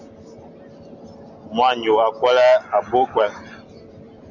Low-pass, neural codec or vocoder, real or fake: 7.2 kHz; none; real